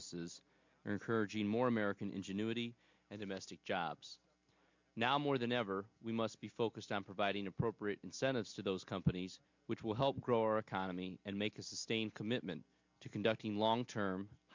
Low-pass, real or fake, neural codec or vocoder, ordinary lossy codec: 7.2 kHz; real; none; MP3, 64 kbps